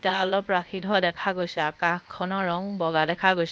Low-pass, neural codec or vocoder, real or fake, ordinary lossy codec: none; codec, 16 kHz, 0.8 kbps, ZipCodec; fake; none